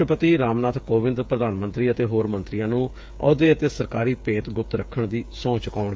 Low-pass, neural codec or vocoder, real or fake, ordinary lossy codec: none; codec, 16 kHz, 8 kbps, FreqCodec, smaller model; fake; none